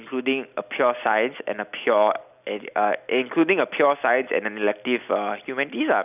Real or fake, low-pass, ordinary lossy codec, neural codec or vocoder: real; 3.6 kHz; none; none